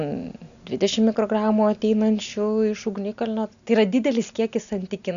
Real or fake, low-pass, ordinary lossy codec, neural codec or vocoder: real; 7.2 kHz; MP3, 96 kbps; none